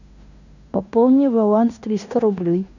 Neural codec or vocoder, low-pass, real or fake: codec, 16 kHz in and 24 kHz out, 0.9 kbps, LongCat-Audio-Codec, fine tuned four codebook decoder; 7.2 kHz; fake